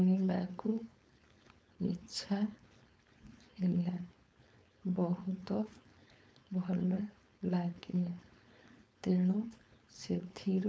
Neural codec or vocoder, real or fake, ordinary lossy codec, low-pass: codec, 16 kHz, 4.8 kbps, FACodec; fake; none; none